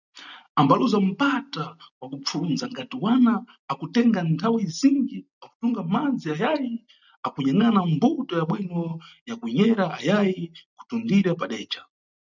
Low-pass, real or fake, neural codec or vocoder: 7.2 kHz; real; none